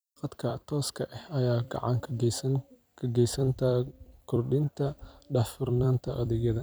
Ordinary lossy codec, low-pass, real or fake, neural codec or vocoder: none; none; fake; vocoder, 44.1 kHz, 128 mel bands every 256 samples, BigVGAN v2